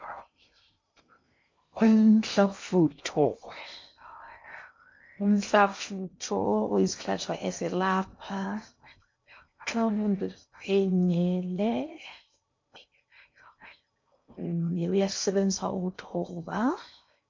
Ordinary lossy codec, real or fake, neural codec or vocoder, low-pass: MP3, 48 kbps; fake; codec, 16 kHz in and 24 kHz out, 0.6 kbps, FocalCodec, streaming, 2048 codes; 7.2 kHz